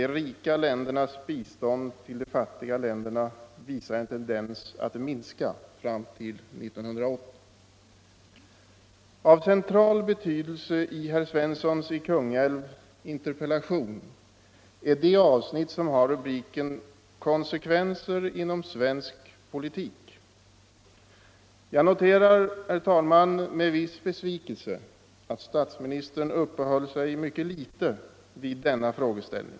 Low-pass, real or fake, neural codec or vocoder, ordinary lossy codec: none; real; none; none